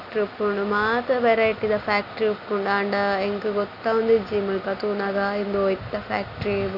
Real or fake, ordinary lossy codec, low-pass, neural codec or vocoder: real; none; 5.4 kHz; none